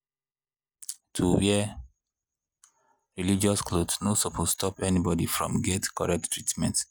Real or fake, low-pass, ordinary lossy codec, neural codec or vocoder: real; none; none; none